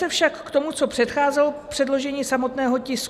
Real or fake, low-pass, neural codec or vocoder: real; 14.4 kHz; none